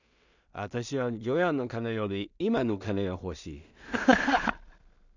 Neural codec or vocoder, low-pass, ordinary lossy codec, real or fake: codec, 16 kHz in and 24 kHz out, 0.4 kbps, LongCat-Audio-Codec, two codebook decoder; 7.2 kHz; none; fake